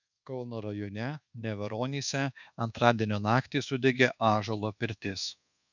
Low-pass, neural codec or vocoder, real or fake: 7.2 kHz; codec, 24 kHz, 1.2 kbps, DualCodec; fake